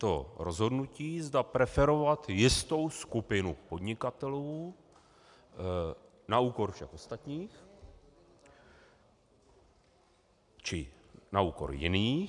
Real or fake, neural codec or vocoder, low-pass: real; none; 10.8 kHz